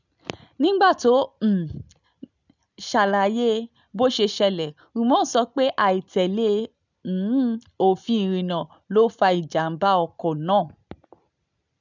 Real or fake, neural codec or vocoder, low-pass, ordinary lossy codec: real; none; 7.2 kHz; none